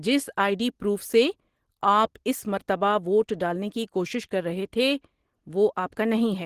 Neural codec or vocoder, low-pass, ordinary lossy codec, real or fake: none; 14.4 kHz; Opus, 16 kbps; real